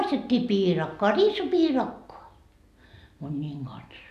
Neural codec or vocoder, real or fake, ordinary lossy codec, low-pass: none; real; none; 14.4 kHz